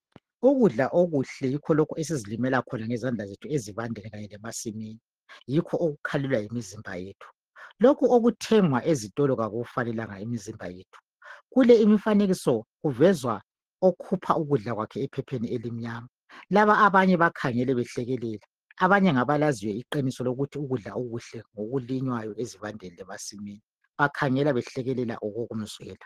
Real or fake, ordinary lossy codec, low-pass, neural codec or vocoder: real; Opus, 16 kbps; 14.4 kHz; none